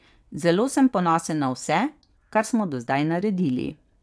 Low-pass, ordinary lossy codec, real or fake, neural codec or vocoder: none; none; fake; vocoder, 22.05 kHz, 80 mel bands, WaveNeXt